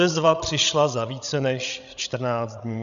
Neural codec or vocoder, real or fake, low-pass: codec, 16 kHz, 16 kbps, FreqCodec, larger model; fake; 7.2 kHz